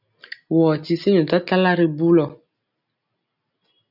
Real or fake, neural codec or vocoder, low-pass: real; none; 5.4 kHz